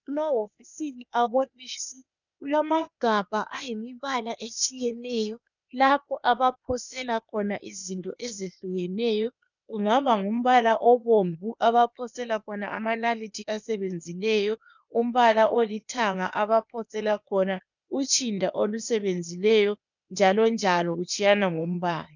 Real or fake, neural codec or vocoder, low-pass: fake; codec, 16 kHz, 0.8 kbps, ZipCodec; 7.2 kHz